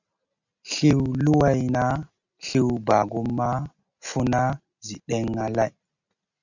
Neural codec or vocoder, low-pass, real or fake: none; 7.2 kHz; real